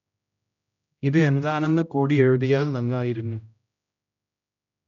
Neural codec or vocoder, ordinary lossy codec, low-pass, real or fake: codec, 16 kHz, 0.5 kbps, X-Codec, HuBERT features, trained on general audio; none; 7.2 kHz; fake